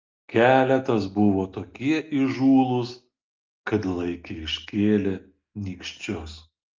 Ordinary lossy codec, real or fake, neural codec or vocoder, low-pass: Opus, 24 kbps; real; none; 7.2 kHz